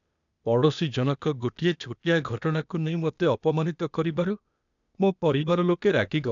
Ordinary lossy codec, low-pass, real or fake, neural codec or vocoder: none; 7.2 kHz; fake; codec, 16 kHz, 0.8 kbps, ZipCodec